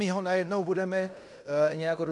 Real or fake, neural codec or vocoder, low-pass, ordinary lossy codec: fake; codec, 16 kHz in and 24 kHz out, 0.9 kbps, LongCat-Audio-Codec, fine tuned four codebook decoder; 10.8 kHz; AAC, 64 kbps